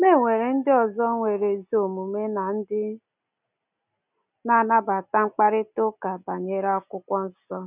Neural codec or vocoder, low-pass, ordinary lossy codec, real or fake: none; 3.6 kHz; none; real